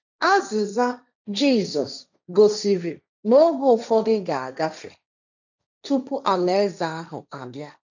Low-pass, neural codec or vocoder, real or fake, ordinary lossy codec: 7.2 kHz; codec, 16 kHz, 1.1 kbps, Voila-Tokenizer; fake; none